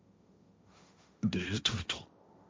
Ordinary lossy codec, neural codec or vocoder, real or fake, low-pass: none; codec, 16 kHz, 1.1 kbps, Voila-Tokenizer; fake; none